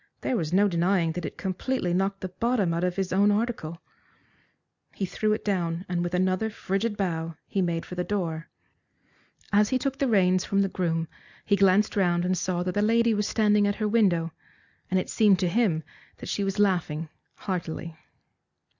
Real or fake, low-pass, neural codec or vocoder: real; 7.2 kHz; none